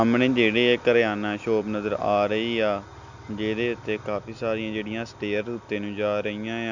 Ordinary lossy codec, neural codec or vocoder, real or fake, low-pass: none; none; real; 7.2 kHz